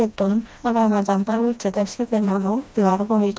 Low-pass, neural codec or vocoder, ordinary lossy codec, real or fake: none; codec, 16 kHz, 1 kbps, FreqCodec, smaller model; none; fake